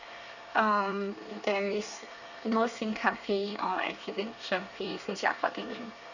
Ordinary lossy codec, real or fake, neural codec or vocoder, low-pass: none; fake; codec, 24 kHz, 1 kbps, SNAC; 7.2 kHz